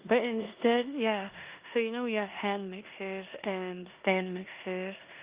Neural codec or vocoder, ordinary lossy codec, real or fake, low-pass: codec, 16 kHz in and 24 kHz out, 0.9 kbps, LongCat-Audio-Codec, four codebook decoder; Opus, 32 kbps; fake; 3.6 kHz